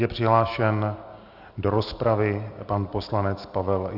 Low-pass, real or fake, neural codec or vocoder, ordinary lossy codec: 5.4 kHz; real; none; Opus, 64 kbps